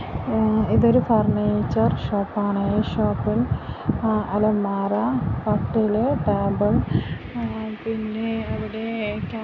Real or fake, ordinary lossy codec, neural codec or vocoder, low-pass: real; none; none; 7.2 kHz